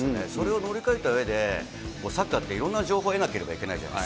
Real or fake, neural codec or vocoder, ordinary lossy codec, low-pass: real; none; none; none